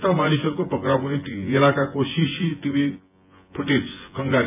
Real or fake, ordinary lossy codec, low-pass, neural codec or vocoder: fake; AAC, 32 kbps; 3.6 kHz; vocoder, 24 kHz, 100 mel bands, Vocos